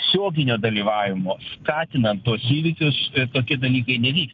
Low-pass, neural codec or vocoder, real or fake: 7.2 kHz; none; real